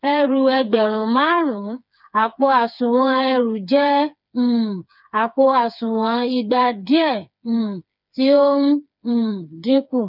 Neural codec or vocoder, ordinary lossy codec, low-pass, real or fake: codec, 16 kHz, 4 kbps, FreqCodec, smaller model; none; 5.4 kHz; fake